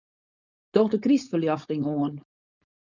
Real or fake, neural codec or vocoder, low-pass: fake; codec, 16 kHz, 4.8 kbps, FACodec; 7.2 kHz